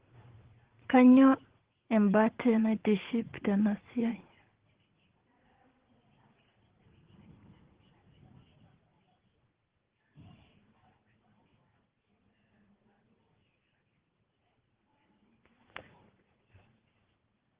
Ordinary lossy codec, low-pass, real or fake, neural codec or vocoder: Opus, 16 kbps; 3.6 kHz; fake; vocoder, 44.1 kHz, 80 mel bands, Vocos